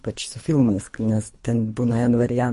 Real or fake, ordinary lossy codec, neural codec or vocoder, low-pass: fake; MP3, 48 kbps; codec, 24 kHz, 3 kbps, HILCodec; 10.8 kHz